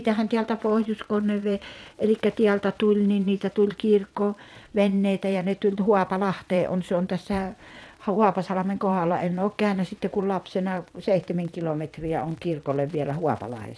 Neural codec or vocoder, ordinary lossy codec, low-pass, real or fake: vocoder, 22.05 kHz, 80 mel bands, Vocos; none; none; fake